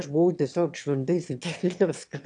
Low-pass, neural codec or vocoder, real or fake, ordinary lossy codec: 9.9 kHz; autoencoder, 22.05 kHz, a latent of 192 numbers a frame, VITS, trained on one speaker; fake; AAC, 64 kbps